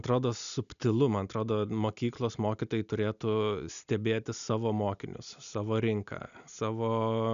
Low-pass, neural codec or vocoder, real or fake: 7.2 kHz; none; real